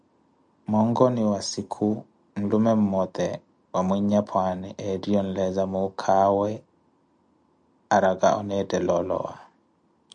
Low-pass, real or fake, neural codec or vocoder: 9.9 kHz; real; none